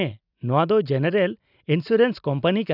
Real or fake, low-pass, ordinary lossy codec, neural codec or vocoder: real; 5.4 kHz; none; none